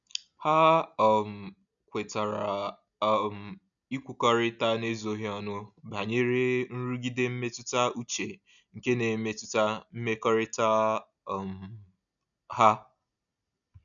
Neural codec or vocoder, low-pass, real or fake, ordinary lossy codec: none; 7.2 kHz; real; none